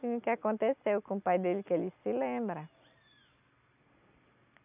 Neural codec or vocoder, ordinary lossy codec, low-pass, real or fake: none; none; 3.6 kHz; real